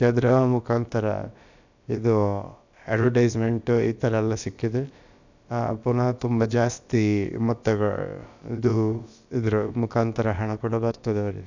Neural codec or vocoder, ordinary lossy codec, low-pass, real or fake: codec, 16 kHz, about 1 kbps, DyCAST, with the encoder's durations; none; 7.2 kHz; fake